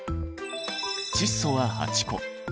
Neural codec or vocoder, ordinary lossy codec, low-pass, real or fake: none; none; none; real